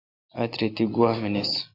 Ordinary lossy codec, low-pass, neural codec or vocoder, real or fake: AAC, 32 kbps; 5.4 kHz; none; real